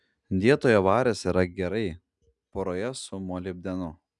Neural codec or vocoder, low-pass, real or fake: none; 10.8 kHz; real